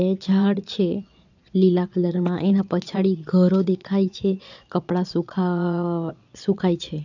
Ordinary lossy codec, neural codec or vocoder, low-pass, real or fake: none; vocoder, 22.05 kHz, 80 mel bands, Vocos; 7.2 kHz; fake